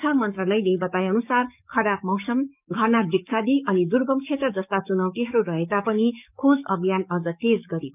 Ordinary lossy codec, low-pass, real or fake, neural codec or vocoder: none; 3.6 kHz; fake; codec, 44.1 kHz, 7.8 kbps, DAC